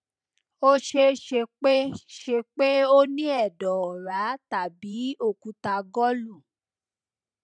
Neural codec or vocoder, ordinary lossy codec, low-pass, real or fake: vocoder, 44.1 kHz, 128 mel bands every 512 samples, BigVGAN v2; none; 9.9 kHz; fake